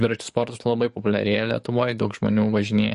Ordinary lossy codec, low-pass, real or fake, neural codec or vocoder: MP3, 48 kbps; 14.4 kHz; fake; codec, 44.1 kHz, 7.8 kbps, DAC